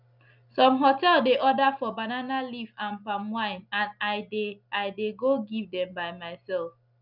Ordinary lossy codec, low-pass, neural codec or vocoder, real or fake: none; 5.4 kHz; none; real